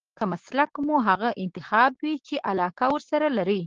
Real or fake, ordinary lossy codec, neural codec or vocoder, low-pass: fake; Opus, 16 kbps; codec, 16 kHz, 6 kbps, DAC; 7.2 kHz